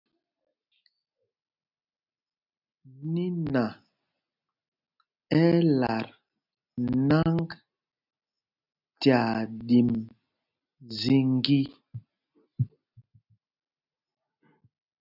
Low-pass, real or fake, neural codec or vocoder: 5.4 kHz; real; none